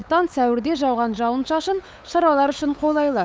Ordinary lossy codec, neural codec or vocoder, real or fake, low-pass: none; codec, 16 kHz, 8 kbps, FunCodec, trained on LibriTTS, 25 frames a second; fake; none